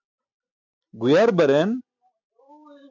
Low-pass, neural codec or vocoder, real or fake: 7.2 kHz; none; real